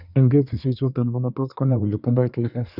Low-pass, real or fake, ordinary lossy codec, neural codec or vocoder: 5.4 kHz; fake; none; codec, 24 kHz, 1 kbps, SNAC